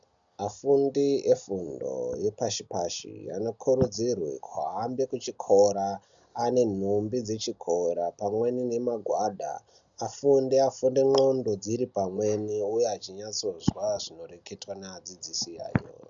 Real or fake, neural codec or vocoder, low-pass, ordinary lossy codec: real; none; 7.2 kHz; MP3, 96 kbps